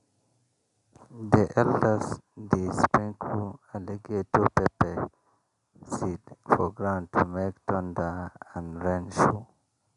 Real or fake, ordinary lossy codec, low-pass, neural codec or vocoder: real; none; 10.8 kHz; none